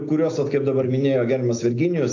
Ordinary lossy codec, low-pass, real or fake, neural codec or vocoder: AAC, 48 kbps; 7.2 kHz; real; none